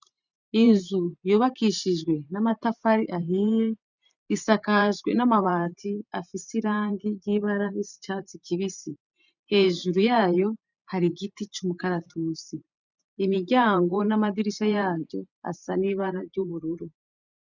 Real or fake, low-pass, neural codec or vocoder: fake; 7.2 kHz; vocoder, 44.1 kHz, 128 mel bands every 512 samples, BigVGAN v2